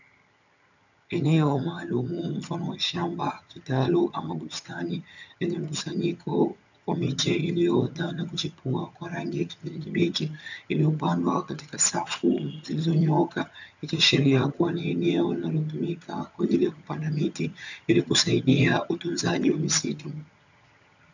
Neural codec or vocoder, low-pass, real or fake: vocoder, 22.05 kHz, 80 mel bands, HiFi-GAN; 7.2 kHz; fake